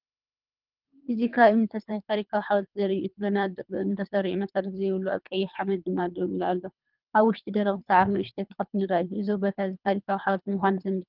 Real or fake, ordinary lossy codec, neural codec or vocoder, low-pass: fake; Opus, 24 kbps; codec, 24 kHz, 3 kbps, HILCodec; 5.4 kHz